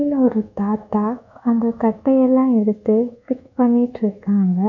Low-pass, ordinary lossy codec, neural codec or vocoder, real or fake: 7.2 kHz; none; codec, 24 kHz, 1.2 kbps, DualCodec; fake